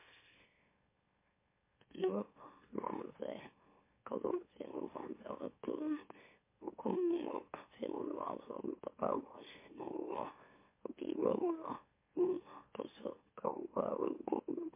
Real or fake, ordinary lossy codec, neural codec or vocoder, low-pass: fake; MP3, 24 kbps; autoencoder, 44.1 kHz, a latent of 192 numbers a frame, MeloTTS; 3.6 kHz